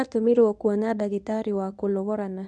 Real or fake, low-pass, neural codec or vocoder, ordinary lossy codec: fake; none; codec, 24 kHz, 0.9 kbps, WavTokenizer, medium speech release version 1; none